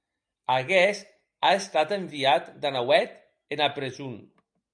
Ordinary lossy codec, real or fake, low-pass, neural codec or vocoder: MP3, 64 kbps; real; 9.9 kHz; none